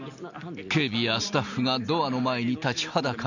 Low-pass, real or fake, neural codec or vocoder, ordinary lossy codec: 7.2 kHz; real; none; none